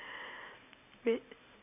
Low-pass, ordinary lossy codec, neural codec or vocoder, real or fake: 3.6 kHz; none; none; real